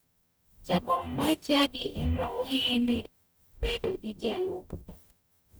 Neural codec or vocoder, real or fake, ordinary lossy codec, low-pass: codec, 44.1 kHz, 0.9 kbps, DAC; fake; none; none